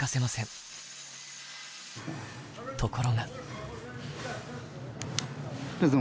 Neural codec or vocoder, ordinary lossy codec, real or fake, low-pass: none; none; real; none